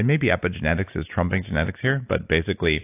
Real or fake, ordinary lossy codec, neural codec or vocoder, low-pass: real; AAC, 24 kbps; none; 3.6 kHz